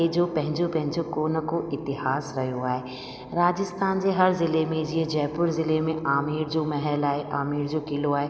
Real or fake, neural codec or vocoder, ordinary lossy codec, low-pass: real; none; none; none